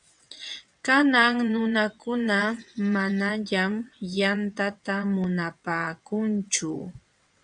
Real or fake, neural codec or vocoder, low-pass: fake; vocoder, 22.05 kHz, 80 mel bands, WaveNeXt; 9.9 kHz